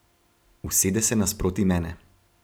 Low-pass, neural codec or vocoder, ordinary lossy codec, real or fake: none; none; none; real